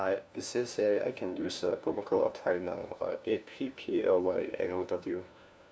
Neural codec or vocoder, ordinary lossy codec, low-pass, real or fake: codec, 16 kHz, 1 kbps, FunCodec, trained on LibriTTS, 50 frames a second; none; none; fake